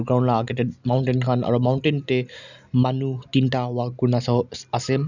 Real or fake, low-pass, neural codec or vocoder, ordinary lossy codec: real; 7.2 kHz; none; none